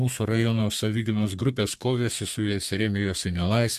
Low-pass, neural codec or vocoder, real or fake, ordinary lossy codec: 14.4 kHz; codec, 32 kHz, 1.9 kbps, SNAC; fake; MP3, 64 kbps